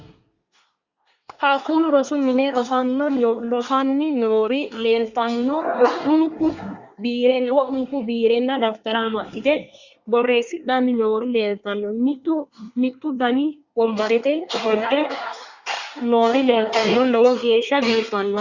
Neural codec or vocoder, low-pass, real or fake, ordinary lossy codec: codec, 24 kHz, 1 kbps, SNAC; 7.2 kHz; fake; Opus, 64 kbps